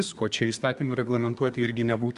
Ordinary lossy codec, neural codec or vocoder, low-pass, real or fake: Opus, 64 kbps; codec, 24 kHz, 1 kbps, SNAC; 10.8 kHz; fake